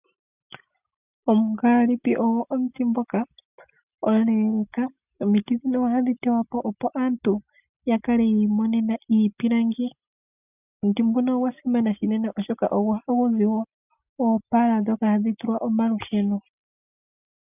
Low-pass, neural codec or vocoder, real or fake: 3.6 kHz; none; real